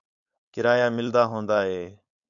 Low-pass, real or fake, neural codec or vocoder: 7.2 kHz; fake; codec, 16 kHz, 4.8 kbps, FACodec